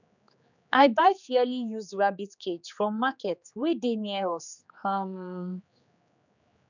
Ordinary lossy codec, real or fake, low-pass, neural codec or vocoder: none; fake; 7.2 kHz; codec, 16 kHz, 4 kbps, X-Codec, HuBERT features, trained on general audio